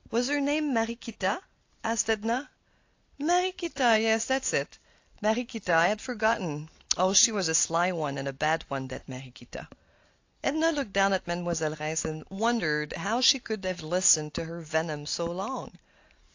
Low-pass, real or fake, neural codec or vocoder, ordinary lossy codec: 7.2 kHz; real; none; AAC, 48 kbps